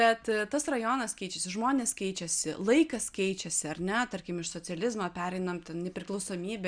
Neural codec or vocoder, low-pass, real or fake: none; 9.9 kHz; real